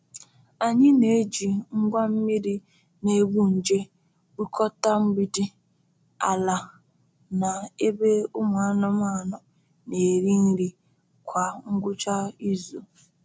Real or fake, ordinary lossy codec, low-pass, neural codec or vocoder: real; none; none; none